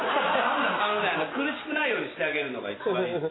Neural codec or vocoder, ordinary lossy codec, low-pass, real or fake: none; AAC, 16 kbps; 7.2 kHz; real